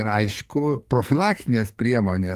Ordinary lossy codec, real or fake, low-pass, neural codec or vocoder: Opus, 32 kbps; fake; 14.4 kHz; codec, 44.1 kHz, 2.6 kbps, SNAC